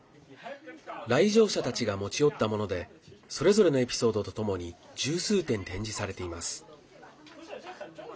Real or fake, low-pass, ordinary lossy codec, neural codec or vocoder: real; none; none; none